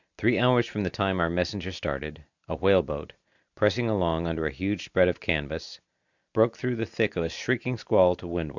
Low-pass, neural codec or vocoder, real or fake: 7.2 kHz; none; real